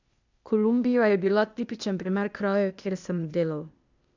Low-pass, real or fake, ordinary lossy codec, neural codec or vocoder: 7.2 kHz; fake; none; codec, 16 kHz, 0.8 kbps, ZipCodec